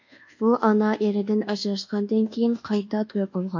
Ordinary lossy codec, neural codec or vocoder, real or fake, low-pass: MP3, 64 kbps; codec, 24 kHz, 1.2 kbps, DualCodec; fake; 7.2 kHz